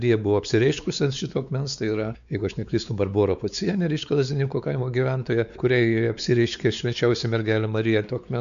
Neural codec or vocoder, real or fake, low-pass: codec, 16 kHz, 4 kbps, X-Codec, WavLM features, trained on Multilingual LibriSpeech; fake; 7.2 kHz